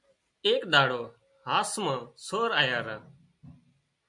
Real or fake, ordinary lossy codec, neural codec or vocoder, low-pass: real; MP3, 64 kbps; none; 10.8 kHz